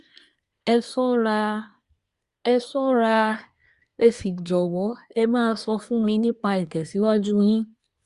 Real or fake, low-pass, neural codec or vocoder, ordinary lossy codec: fake; 10.8 kHz; codec, 24 kHz, 1 kbps, SNAC; Opus, 64 kbps